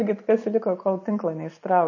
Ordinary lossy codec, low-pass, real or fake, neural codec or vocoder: MP3, 32 kbps; 7.2 kHz; real; none